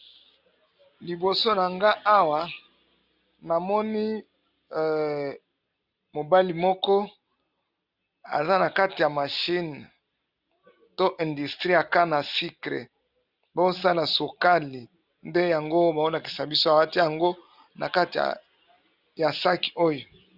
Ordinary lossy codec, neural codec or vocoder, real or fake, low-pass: Opus, 64 kbps; none; real; 5.4 kHz